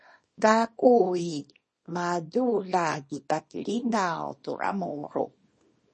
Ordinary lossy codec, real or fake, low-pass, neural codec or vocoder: MP3, 32 kbps; fake; 10.8 kHz; codec, 24 kHz, 0.9 kbps, WavTokenizer, small release